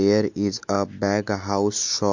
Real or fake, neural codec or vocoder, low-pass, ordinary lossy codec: real; none; 7.2 kHz; MP3, 48 kbps